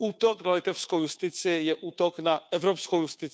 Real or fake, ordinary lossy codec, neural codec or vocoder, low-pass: fake; none; codec, 16 kHz, 2 kbps, FunCodec, trained on Chinese and English, 25 frames a second; none